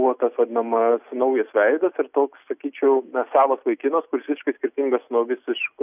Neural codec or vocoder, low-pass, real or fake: none; 3.6 kHz; real